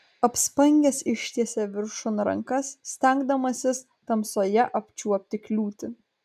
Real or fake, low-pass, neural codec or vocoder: real; 14.4 kHz; none